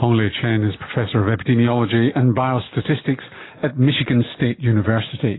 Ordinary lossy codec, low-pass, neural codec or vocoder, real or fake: AAC, 16 kbps; 7.2 kHz; none; real